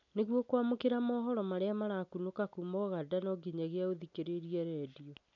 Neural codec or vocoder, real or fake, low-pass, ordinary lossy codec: none; real; 7.2 kHz; none